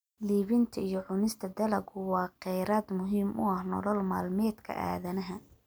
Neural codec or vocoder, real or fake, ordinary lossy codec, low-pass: none; real; none; none